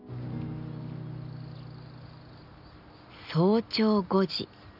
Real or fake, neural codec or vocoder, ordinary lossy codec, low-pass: real; none; none; 5.4 kHz